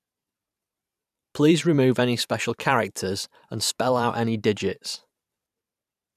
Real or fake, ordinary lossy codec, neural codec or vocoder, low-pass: fake; none; vocoder, 44.1 kHz, 128 mel bands every 512 samples, BigVGAN v2; 14.4 kHz